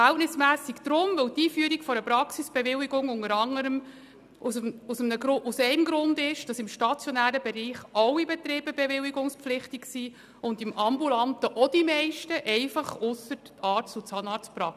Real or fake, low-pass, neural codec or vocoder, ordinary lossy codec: real; 14.4 kHz; none; none